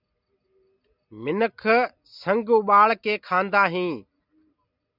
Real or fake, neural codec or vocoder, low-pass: real; none; 5.4 kHz